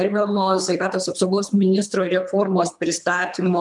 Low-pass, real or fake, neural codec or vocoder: 10.8 kHz; fake; codec, 24 kHz, 3 kbps, HILCodec